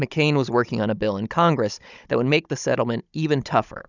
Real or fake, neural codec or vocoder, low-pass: fake; codec, 16 kHz, 16 kbps, FunCodec, trained on Chinese and English, 50 frames a second; 7.2 kHz